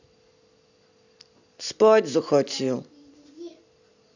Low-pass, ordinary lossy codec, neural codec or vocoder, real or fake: 7.2 kHz; none; none; real